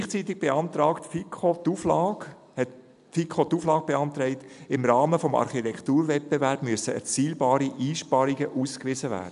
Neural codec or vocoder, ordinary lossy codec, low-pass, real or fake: none; AAC, 96 kbps; 10.8 kHz; real